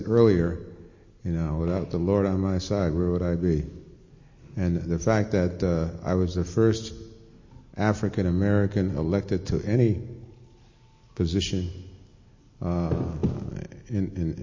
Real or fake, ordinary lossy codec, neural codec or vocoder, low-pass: real; MP3, 32 kbps; none; 7.2 kHz